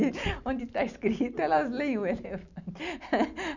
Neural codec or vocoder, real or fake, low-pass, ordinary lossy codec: none; real; 7.2 kHz; none